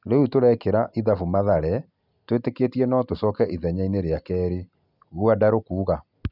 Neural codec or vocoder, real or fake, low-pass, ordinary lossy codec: none; real; 5.4 kHz; none